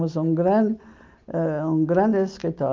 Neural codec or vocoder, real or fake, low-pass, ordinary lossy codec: none; real; 7.2 kHz; Opus, 24 kbps